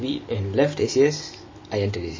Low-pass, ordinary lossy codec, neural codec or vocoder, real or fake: 7.2 kHz; MP3, 32 kbps; none; real